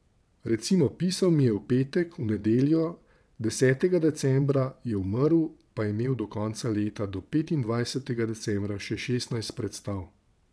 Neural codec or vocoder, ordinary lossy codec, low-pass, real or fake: vocoder, 22.05 kHz, 80 mel bands, WaveNeXt; none; none; fake